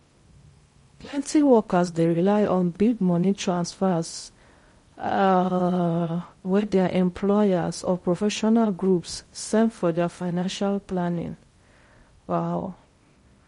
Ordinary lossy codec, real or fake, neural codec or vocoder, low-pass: MP3, 48 kbps; fake; codec, 16 kHz in and 24 kHz out, 0.6 kbps, FocalCodec, streaming, 2048 codes; 10.8 kHz